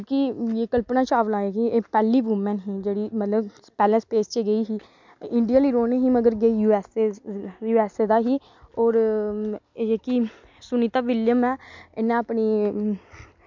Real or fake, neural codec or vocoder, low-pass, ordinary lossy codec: real; none; 7.2 kHz; MP3, 64 kbps